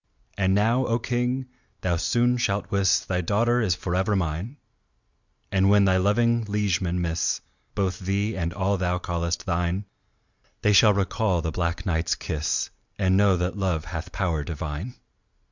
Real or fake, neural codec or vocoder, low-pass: real; none; 7.2 kHz